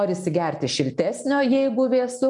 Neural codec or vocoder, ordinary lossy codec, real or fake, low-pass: none; MP3, 96 kbps; real; 10.8 kHz